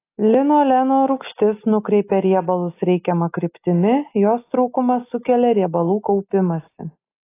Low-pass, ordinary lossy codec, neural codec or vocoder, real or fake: 3.6 kHz; AAC, 24 kbps; none; real